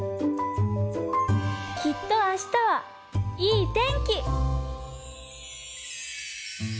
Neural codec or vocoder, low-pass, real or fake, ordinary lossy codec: none; none; real; none